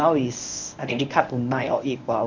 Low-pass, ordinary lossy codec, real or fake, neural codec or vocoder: 7.2 kHz; none; fake; codec, 24 kHz, 0.9 kbps, WavTokenizer, medium speech release version 1